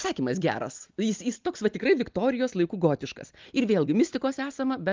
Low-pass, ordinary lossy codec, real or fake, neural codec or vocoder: 7.2 kHz; Opus, 32 kbps; real; none